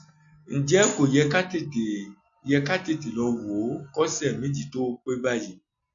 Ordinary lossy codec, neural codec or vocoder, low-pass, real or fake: none; none; 7.2 kHz; real